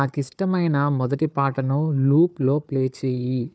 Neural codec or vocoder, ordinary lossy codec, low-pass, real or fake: codec, 16 kHz, 4 kbps, FunCodec, trained on Chinese and English, 50 frames a second; none; none; fake